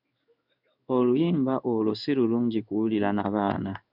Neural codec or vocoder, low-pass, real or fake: codec, 16 kHz in and 24 kHz out, 1 kbps, XY-Tokenizer; 5.4 kHz; fake